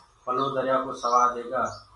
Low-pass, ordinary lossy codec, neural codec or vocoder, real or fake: 10.8 kHz; MP3, 96 kbps; none; real